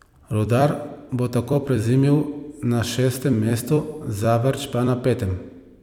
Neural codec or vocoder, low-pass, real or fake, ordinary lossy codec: vocoder, 44.1 kHz, 128 mel bands every 256 samples, BigVGAN v2; 19.8 kHz; fake; none